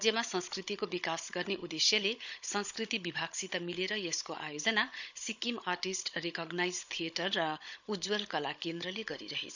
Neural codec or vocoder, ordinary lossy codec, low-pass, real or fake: codec, 16 kHz, 16 kbps, FunCodec, trained on Chinese and English, 50 frames a second; none; 7.2 kHz; fake